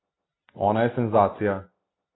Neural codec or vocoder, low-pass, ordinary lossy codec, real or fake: none; 7.2 kHz; AAC, 16 kbps; real